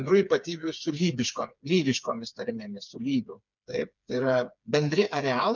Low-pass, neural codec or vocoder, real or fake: 7.2 kHz; codec, 16 kHz, 4 kbps, FreqCodec, smaller model; fake